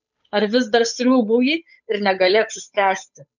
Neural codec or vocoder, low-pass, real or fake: codec, 16 kHz, 8 kbps, FunCodec, trained on Chinese and English, 25 frames a second; 7.2 kHz; fake